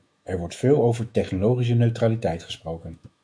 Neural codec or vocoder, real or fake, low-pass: autoencoder, 48 kHz, 128 numbers a frame, DAC-VAE, trained on Japanese speech; fake; 9.9 kHz